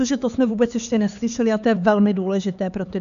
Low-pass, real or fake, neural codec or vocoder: 7.2 kHz; fake; codec, 16 kHz, 4 kbps, X-Codec, HuBERT features, trained on LibriSpeech